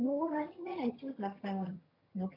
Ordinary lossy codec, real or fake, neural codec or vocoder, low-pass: none; fake; vocoder, 22.05 kHz, 80 mel bands, HiFi-GAN; 5.4 kHz